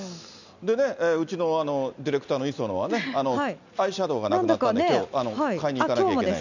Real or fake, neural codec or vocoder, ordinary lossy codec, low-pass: real; none; none; 7.2 kHz